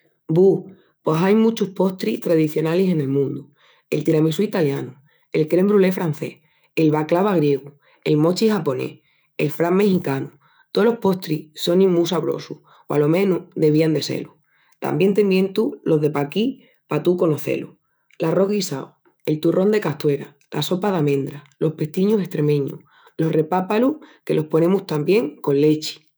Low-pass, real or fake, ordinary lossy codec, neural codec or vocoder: none; fake; none; autoencoder, 48 kHz, 128 numbers a frame, DAC-VAE, trained on Japanese speech